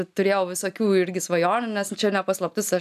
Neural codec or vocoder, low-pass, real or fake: none; 14.4 kHz; real